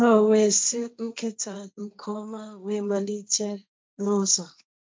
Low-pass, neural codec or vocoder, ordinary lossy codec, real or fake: none; codec, 16 kHz, 1.1 kbps, Voila-Tokenizer; none; fake